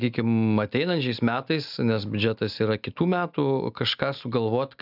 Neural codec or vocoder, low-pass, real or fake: none; 5.4 kHz; real